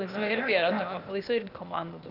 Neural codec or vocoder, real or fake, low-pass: codec, 16 kHz, 0.8 kbps, ZipCodec; fake; 5.4 kHz